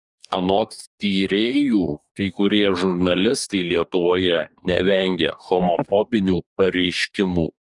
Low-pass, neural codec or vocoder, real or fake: 10.8 kHz; codec, 44.1 kHz, 2.6 kbps, DAC; fake